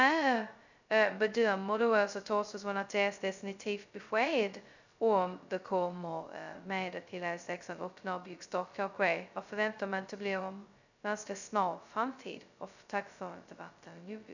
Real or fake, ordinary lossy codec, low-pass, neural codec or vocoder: fake; none; 7.2 kHz; codec, 16 kHz, 0.2 kbps, FocalCodec